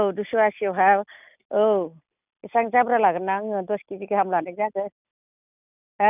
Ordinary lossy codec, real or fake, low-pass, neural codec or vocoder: none; real; 3.6 kHz; none